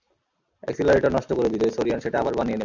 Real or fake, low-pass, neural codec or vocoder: real; 7.2 kHz; none